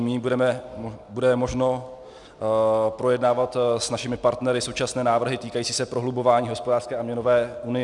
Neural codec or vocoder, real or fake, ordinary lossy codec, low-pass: none; real; Opus, 64 kbps; 10.8 kHz